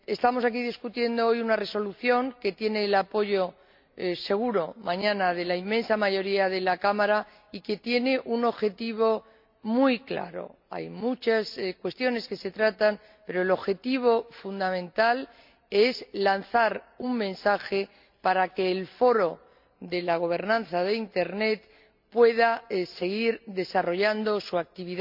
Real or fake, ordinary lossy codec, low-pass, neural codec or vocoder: real; MP3, 48 kbps; 5.4 kHz; none